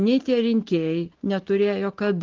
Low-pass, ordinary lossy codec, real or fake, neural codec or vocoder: 7.2 kHz; Opus, 16 kbps; real; none